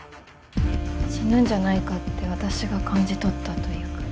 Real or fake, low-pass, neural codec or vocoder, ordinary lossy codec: real; none; none; none